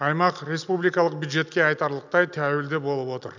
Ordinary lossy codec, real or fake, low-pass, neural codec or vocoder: none; real; 7.2 kHz; none